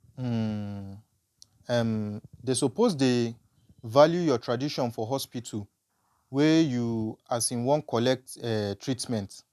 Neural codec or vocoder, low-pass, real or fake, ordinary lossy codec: none; 14.4 kHz; real; none